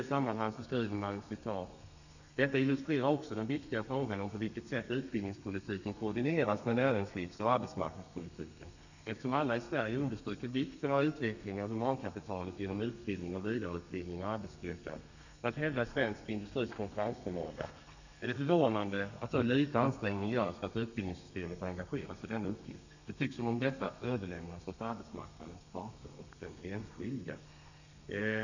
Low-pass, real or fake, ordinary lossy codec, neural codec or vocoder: 7.2 kHz; fake; none; codec, 32 kHz, 1.9 kbps, SNAC